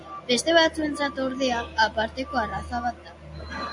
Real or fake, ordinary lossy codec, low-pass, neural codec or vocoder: real; AAC, 64 kbps; 10.8 kHz; none